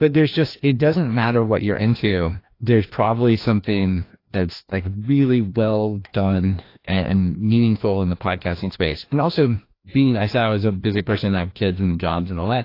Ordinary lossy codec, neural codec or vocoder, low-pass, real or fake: AAC, 32 kbps; codec, 16 kHz, 1 kbps, FreqCodec, larger model; 5.4 kHz; fake